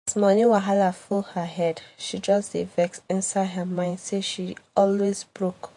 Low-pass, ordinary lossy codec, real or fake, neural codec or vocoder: 10.8 kHz; MP3, 48 kbps; fake; vocoder, 48 kHz, 128 mel bands, Vocos